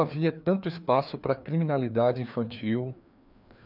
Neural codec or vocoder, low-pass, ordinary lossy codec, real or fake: codec, 16 kHz, 2 kbps, FreqCodec, larger model; 5.4 kHz; none; fake